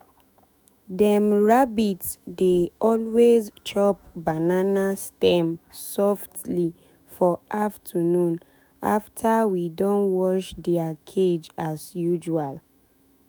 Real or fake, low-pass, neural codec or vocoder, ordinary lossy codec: fake; none; autoencoder, 48 kHz, 128 numbers a frame, DAC-VAE, trained on Japanese speech; none